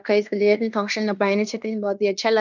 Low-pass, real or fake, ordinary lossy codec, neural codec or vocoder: 7.2 kHz; fake; none; codec, 16 kHz in and 24 kHz out, 0.9 kbps, LongCat-Audio-Codec, fine tuned four codebook decoder